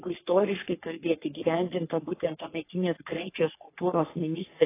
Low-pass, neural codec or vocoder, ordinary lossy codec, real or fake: 3.6 kHz; codec, 44.1 kHz, 3.4 kbps, Pupu-Codec; AAC, 24 kbps; fake